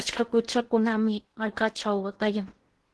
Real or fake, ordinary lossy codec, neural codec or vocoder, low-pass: fake; Opus, 16 kbps; codec, 16 kHz in and 24 kHz out, 0.8 kbps, FocalCodec, streaming, 65536 codes; 10.8 kHz